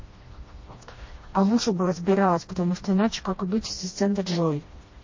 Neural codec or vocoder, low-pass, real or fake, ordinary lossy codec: codec, 16 kHz, 1 kbps, FreqCodec, smaller model; 7.2 kHz; fake; MP3, 32 kbps